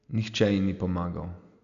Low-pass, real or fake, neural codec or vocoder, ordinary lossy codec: 7.2 kHz; real; none; none